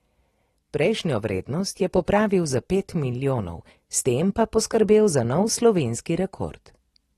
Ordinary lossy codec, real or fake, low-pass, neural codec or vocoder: AAC, 32 kbps; real; 19.8 kHz; none